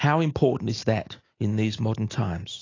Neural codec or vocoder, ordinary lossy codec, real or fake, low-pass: none; AAC, 32 kbps; real; 7.2 kHz